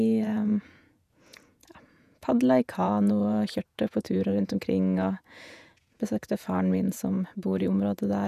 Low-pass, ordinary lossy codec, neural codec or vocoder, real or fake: 14.4 kHz; none; vocoder, 48 kHz, 128 mel bands, Vocos; fake